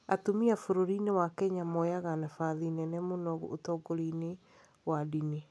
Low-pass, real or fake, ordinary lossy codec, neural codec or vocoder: none; real; none; none